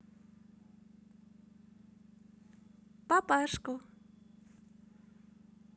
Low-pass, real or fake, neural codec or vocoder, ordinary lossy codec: none; real; none; none